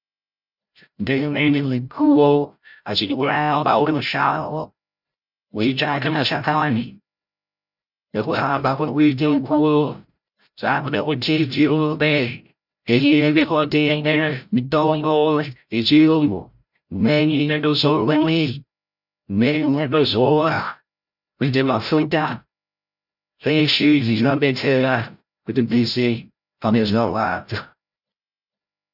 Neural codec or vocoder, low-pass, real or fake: codec, 16 kHz, 0.5 kbps, FreqCodec, larger model; 5.4 kHz; fake